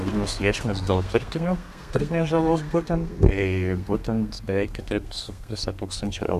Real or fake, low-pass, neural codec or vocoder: fake; 14.4 kHz; codec, 32 kHz, 1.9 kbps, SNAC